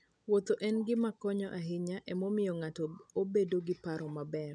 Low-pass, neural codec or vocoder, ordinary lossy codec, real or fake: 9.9 kHz; none; none; real